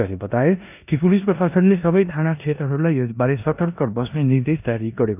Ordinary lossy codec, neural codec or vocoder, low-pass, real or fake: none; codec, 16 kHz in and 24 kHz out, 0.9 kbps, LongCat-Audio-Codec, four codebook decoder; 3.6 kHz; fake